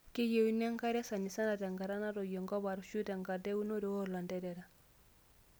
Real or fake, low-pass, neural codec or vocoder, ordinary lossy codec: real; none; none; none